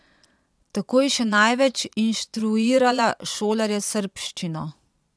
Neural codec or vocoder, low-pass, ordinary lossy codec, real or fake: vocoder, 22.05 kHz, 80 mel bands, Vocos; none; none; fake